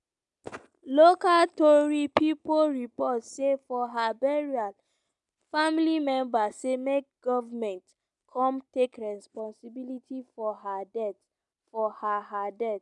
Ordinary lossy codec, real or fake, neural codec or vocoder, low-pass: none; real; none; 10.8 kHz